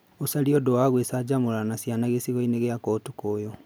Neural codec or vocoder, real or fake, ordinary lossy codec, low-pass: none; real; none; none